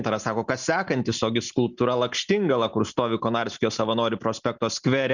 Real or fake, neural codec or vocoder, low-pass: real; none; 7.2 kHz